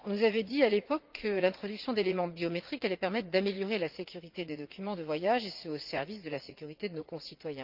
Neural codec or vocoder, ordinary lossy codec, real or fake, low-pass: vocoder, 44.1 kHz, 80 mel bands, Vocos; Opus, 24 kbps; fake; 5.4 kHz